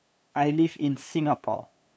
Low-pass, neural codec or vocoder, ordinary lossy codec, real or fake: none; codec, 16 kHz, 2 kbps, FunCodec, trained on LibriTTS, 25 frames a second; none; fake